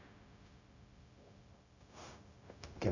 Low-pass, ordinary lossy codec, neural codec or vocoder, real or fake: 7.2 kHz; none; codec, 16 kHz, 0.4 kbps, LongCat-Audio-Codec; fake